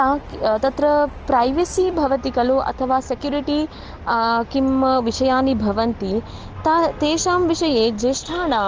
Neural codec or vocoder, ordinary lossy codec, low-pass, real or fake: none; Opus, 16 kbps; 7.2 kHz; real